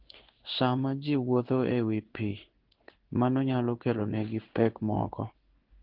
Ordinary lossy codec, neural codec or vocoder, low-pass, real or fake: Opus, 16 kbps; codec, 16 kHz in and 24 kHz out, 1 kbps, XY-Tokenizer; 5.4 kHz; fake